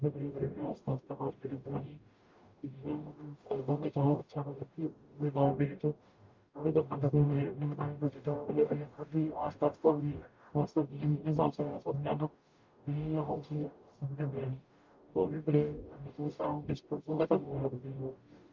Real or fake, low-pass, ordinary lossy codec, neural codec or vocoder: fake; 7.2 kHz; Opus, 24 kbps; codec, 44.1 kHz, 0.9 kbps, DAC